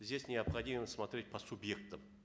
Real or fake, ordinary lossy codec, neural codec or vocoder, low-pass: real; none; none; none